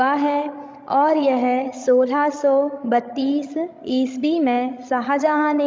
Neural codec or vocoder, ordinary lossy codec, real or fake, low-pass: codec, 16 kHz, 16 kbps, FreqCodec, larger model; none; fake; none